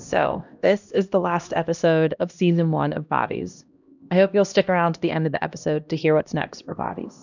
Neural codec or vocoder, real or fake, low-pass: codec, 16 kHz, 1 kbps, X-Codec, HuBERT features, trained on LibriSpeech; fake; 7.2 kHz